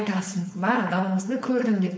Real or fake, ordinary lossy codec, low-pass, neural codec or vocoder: fake; none; none; codec, 16 kHz, 4.8 kbps, FACodec